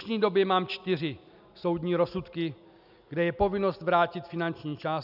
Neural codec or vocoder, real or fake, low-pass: none; real; 5.4 kHz